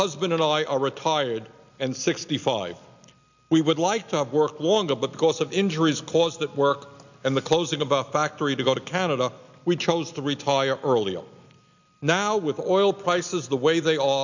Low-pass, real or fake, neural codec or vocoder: 7.2 kHz; real; none